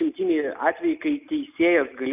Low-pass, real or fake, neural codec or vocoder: 3.6 kHz; real; none